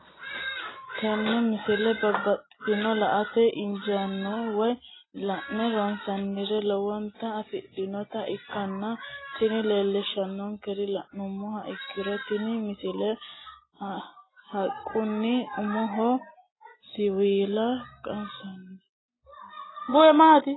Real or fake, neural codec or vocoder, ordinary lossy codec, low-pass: real; none; AAC, 16 kbps; 7.2 kHz